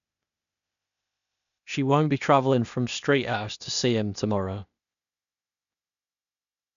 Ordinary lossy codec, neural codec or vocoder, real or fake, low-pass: none; codec, 16 kHz, 0.8 kbps, ZipCodec; fake; 7.2 kHz